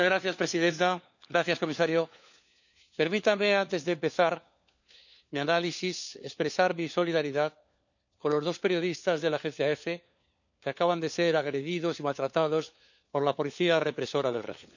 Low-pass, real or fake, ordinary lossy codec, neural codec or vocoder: 7.2 kHz; fake; none; codec, 16 kHz, 4 kbps, FunCodec, trained on LibriTTS, 50 frames a second